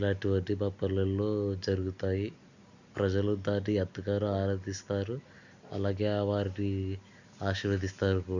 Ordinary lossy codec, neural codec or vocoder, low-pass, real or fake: none; none; 7.2 kHz; real